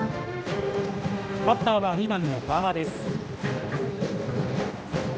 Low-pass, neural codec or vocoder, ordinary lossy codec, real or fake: none; codec, 16 kHz, 1 kbps, X-Codec, HuBERT features, trained on general audio; none; fake